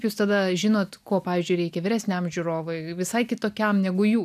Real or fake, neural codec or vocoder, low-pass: real; none; 14.4 kHz